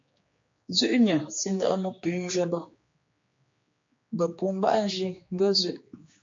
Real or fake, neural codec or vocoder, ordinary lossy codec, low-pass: fake; codec, 16 kHz, 2 kbps, X-Codec, HuBERT features, trained on general audio; MP3, 48 kbps; 7.2 kHz